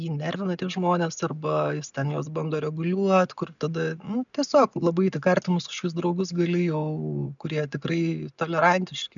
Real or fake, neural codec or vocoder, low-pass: real; none; 7.2 kHz